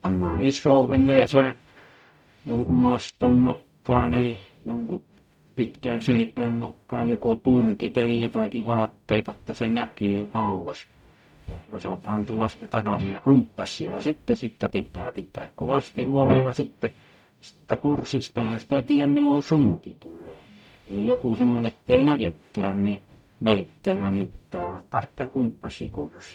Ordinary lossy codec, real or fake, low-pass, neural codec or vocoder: none; fake; 19.8 kHz; codec, 44.1 kHz, 0.9 kbps, DAC